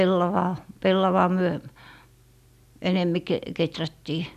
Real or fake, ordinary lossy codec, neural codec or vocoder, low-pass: real; none; none; 14.4 kHz